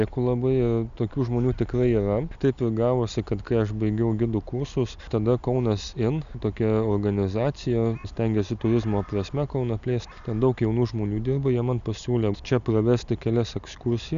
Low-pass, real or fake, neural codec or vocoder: 7.2 kHz; real; none